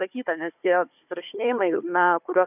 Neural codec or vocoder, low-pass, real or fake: codec, 16 kHz, 4 kbps, FunCodec, trained on Chinese and English, 50 frames a second; 3.6 kHz; fake